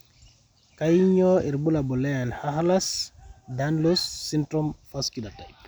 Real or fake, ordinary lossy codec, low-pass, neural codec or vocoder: real; none; none; none